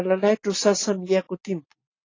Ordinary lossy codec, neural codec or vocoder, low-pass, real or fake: AAC, 32 kbps; none; 7.2 kHz; real